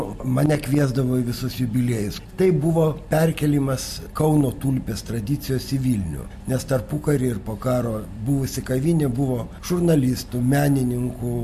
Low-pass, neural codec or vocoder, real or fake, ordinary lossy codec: 14.4 kHz; none; real; MP3, 64 kbps